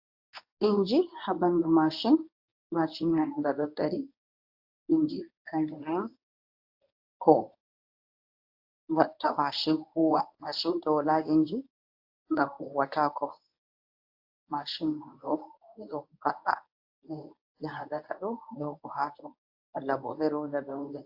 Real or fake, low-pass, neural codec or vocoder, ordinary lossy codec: fake; 5.4 kHz; codec, 24 kHz, 0.9 kbps, WavTokenizer, medium speech release version 1; AAC, 48 kbps